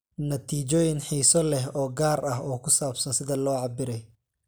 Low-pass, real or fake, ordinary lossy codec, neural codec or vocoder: none; real; none; none